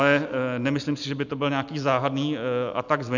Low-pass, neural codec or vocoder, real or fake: 7.2 kHz; none; real